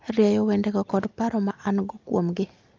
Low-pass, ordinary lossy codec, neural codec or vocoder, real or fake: 7.2 kHz; Opus, 24 kbps; none; real